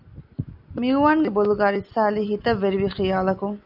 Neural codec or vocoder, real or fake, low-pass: none; real; 5.4 kHz